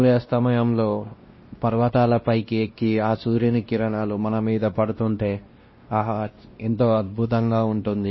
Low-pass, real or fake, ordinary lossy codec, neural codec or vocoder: 7.2 kHz; fake; MP3, 24 kbps; codec, 16 kHz, 1 kbps, X-Codec, WavLM features, trained on Multilingual LibriSpeech